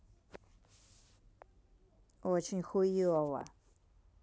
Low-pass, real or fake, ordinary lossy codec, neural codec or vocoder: none; real; none; none